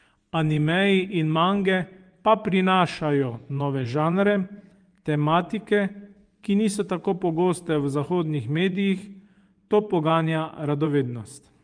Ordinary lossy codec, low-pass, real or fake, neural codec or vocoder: Opus, 32 kbps; 9.9 kHz; fake; vocoder, 24 kHz, 100 mel bands, Vocos